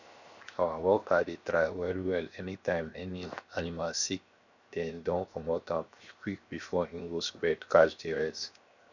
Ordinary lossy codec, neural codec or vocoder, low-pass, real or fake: none; codec, 16 kHz, 0.7 kbps, FocalCodec; 7.2 kHz; fake